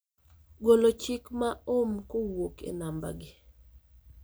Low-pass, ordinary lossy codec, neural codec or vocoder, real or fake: none; none; none; real